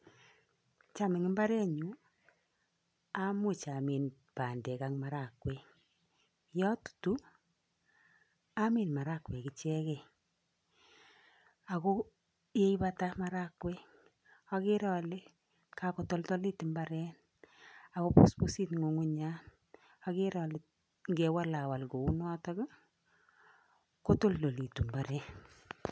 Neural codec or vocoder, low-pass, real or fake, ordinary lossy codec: none; none; real; none